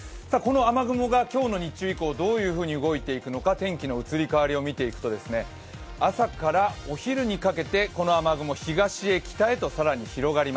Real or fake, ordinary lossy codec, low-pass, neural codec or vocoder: real; none; none; none